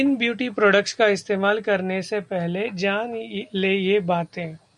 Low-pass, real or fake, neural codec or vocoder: 10.8 kHz; real; none